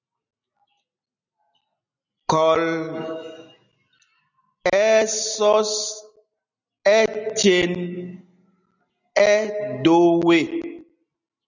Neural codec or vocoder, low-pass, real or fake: none; 7.2 kHz; real